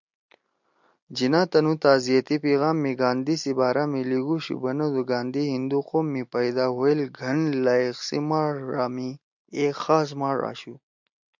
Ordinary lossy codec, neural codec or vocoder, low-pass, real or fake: MP3, 64 kbps; none; 7.2 kHz; real